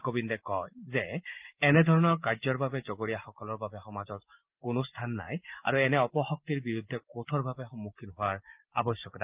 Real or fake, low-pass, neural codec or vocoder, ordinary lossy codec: real; 3.6 kHz; none; Opus, 32 kbps